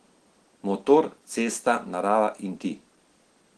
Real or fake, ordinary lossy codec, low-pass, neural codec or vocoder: real; Opus, 16 kbps; 10.8 kHz; none